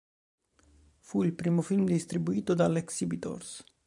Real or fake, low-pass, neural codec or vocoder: fake; 10.8 kHz; vocoder, 44.1 kHz, 128 mel bands every 512 samples, BigVGAN v2